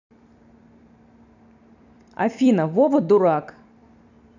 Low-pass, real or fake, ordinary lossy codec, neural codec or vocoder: 7.2 kHz; fake; none; vocoder, 44.1 kHz, 128 mel bands every 256 samples, BigVGAN v2